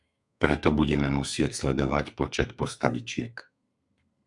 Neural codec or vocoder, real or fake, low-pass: codec, 32 kHz, 1.9 kbps, SNAC; fake; 10.8 kHz